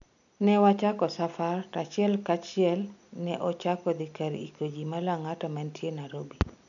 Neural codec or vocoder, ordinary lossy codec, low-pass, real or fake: none; none; 7.2 kHz; real